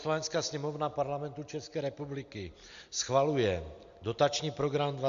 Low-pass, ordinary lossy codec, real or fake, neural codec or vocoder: 7.2 kHz; Opus, 64 kbps; real; none